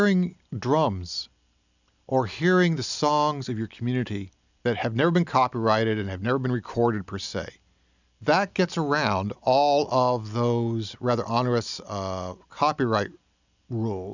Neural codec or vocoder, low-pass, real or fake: none; 7.2 kHz; real